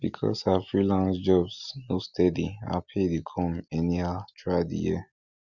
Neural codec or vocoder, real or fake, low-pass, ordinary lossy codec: none; real; 7.2 kHz; Opus, 64 kbps